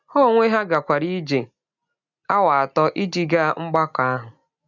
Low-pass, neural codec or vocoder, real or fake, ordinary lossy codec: 7.2 kHz; none; real; none